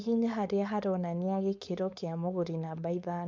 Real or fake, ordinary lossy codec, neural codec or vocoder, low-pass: fake; none; codec, 16 kHz, 4.8 kbps, FACodec; none